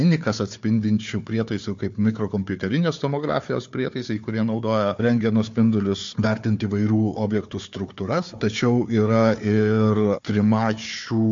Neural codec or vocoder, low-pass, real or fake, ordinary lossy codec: codec, 16 kHz, 4 kbps, FunCodec, trained on Chinese and English, 50 frames a second; 7.2 kHz; fake; MP3, 48 kbps